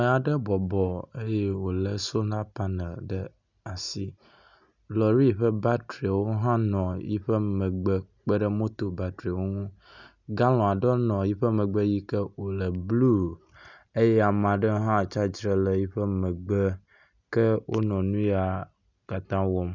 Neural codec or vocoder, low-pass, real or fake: none; 7.2 kHz; real